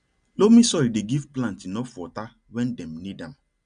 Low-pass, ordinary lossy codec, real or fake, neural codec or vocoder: 9.9 kHz; none; real; none